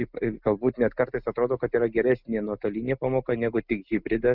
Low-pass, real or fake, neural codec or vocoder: 5.4 kHz; real; none